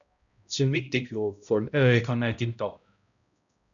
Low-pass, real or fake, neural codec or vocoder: 7.2 kHz; fake; codec, 16 kHz, 0.5 kbps, X-Codec, HuBERT features, trained on balanced general audio